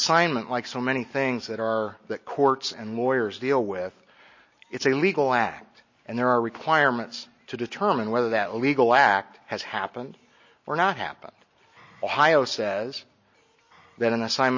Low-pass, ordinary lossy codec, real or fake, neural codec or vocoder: 7.2 kHz; MP3, 32 kbps; real; none